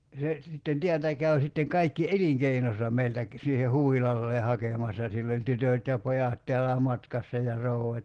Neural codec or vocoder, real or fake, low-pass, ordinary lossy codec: none; real; 9.9 kHz; Opus, 16 kbps